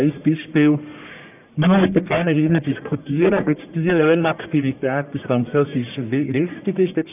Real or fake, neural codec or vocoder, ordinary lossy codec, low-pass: fake; codec, 44.1 kHz, 1.7 kbps, Pupu-Codec; none; 3.6 kHz